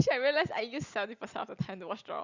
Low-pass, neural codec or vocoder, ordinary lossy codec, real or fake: 7.2 kHz; none; Opus, 64 kbps; real